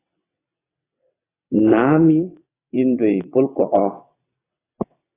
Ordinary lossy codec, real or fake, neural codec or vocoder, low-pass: AAC, 16 kbps; fake; vocoder, 22.05 kHz, 80 mel bands, WaveNeXt; 3.6 kHz